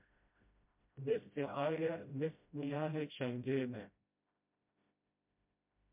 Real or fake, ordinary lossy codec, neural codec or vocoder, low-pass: fake; MP3, 32 kbps; codec, 16 kHz, 0.5 kbps, FreqCodec, smaller model; 3.6 kHz